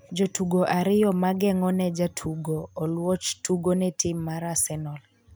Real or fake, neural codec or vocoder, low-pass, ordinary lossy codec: real; none; none; none